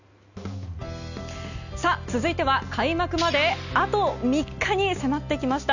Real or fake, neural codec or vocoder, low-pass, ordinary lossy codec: real; none; 7.2 kHz; none